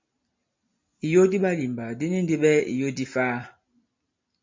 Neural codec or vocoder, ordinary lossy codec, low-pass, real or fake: none; MP3, 64 kbps; 7.2 kHz; real